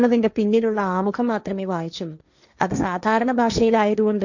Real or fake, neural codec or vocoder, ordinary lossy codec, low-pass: fake; codec, 16 kHz, 1.1 kbps, Voila-Tokenizer; none; 7.2 kHz